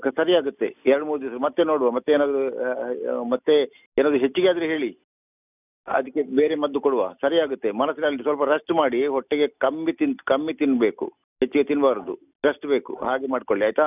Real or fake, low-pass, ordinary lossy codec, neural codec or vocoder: real; 3.6 kHz; none; none